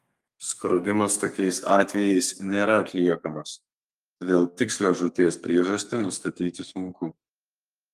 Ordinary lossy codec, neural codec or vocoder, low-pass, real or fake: Opus, 32 kbps; codec, 32 kHz, 1.9 kbps, SNAC; 14.4 kHz; fake